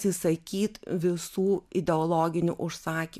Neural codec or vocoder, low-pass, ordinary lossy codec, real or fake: none; 14.4 kHz; MP3, 96 kbps; real